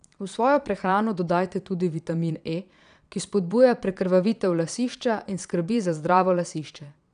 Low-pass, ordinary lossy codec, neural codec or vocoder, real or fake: 9.9 kHz; none; vocoder, 22.05 kHz, 80 mel bands, Vocos; fake